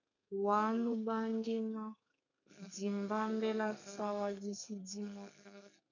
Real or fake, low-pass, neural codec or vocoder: fake; 7.2 kHz; codec, 32 kHz, 1.9 kbps, SNAC